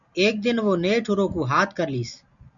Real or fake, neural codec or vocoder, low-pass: real; none; 7.2 kHz